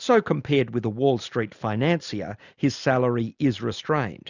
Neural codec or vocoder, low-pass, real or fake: none; 7.2 kHz; real